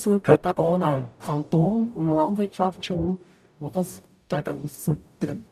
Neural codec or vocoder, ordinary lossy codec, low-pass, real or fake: codec, 44.1 kHz, 0.9 kbps, DAC; none; 14.4 kHz; fake